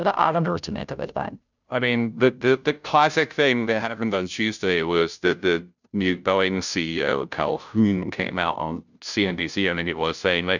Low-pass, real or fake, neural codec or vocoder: 7.2 kHz; fake; codec, 16 kHz, 0.5 kbps, FunCodec, trained on Chinese and English, 25 frames a second